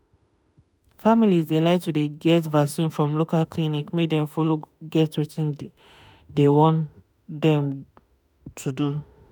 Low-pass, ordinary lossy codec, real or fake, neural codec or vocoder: none; none; fake; autoencoder, 48 kHz, 32 numbers a frame, DAC-VAE, trained on Japanese speech